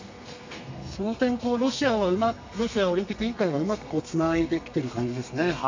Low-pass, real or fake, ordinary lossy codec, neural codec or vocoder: 7.2 kHz; fake; MP3, 64 kbps; codec, 32 kHz, 1.9 kbps, SNAC